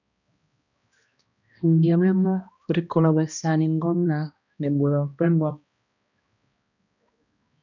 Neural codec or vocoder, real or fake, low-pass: codec, 16 kHz, 1 kbps, X-Codec, HuBERT features, trained on balanced general audio; fake; 7.2 kHz